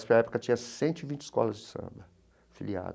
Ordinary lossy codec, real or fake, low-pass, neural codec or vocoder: none; real; none; none